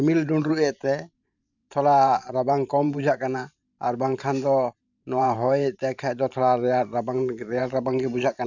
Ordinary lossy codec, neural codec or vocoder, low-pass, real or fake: none; vocoder, 44.1 kHz, 128 mel bands every 512 samples, BigVGAN v2; 7.2 kHz; fake